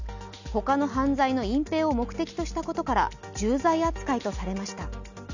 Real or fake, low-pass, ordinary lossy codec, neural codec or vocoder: real; 7.2 kHz; none; none